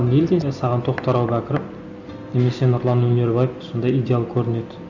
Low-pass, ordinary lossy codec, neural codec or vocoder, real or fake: 7.2 kHz; none; none; real